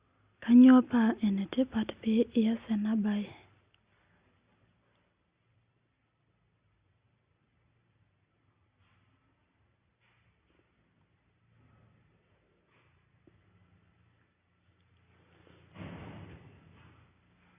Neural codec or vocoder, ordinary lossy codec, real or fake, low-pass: none; Opus, 64 kbps; real; 3.6 kHz